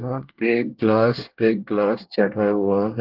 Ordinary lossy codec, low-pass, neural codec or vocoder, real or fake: Opus, 16 kbps; 5.4 kHz; codec, 24 kHz, 1 kbps, SNAC; fake